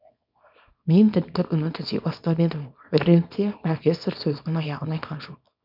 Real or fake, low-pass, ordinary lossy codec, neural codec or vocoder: fake; 5.4 kHz; none; codec, 24 kHz, 0.9 kbps, WavTokenizer, small release